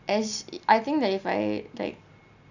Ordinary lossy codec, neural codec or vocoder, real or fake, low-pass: none; none; real; 7.2 kHz